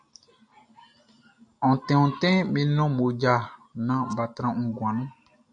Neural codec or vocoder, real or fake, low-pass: none; real; 9.9 kHz